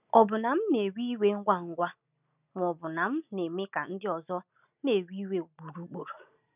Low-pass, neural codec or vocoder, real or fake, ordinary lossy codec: 3.6 kHz; none; real; none